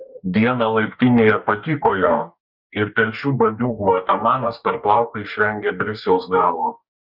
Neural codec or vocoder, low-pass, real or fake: codec, 44.1 kHz, 2.6 kbps, DAC; 5.4 kHz; fake